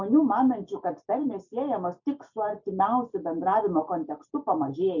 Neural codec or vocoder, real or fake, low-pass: none; real; 7.2 kHz